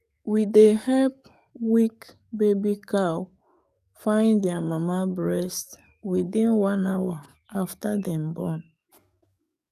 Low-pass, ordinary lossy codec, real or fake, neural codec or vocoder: 14.4 kHz; none; fake; codec, 44.1 kHz, 7.8 kbps, DAC